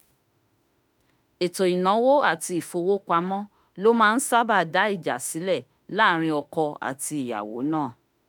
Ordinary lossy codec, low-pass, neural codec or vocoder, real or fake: none; none; autoencoder, 48 kHz, 32 numbers a frame, DAC-VAE, trained on Japanese speech; fake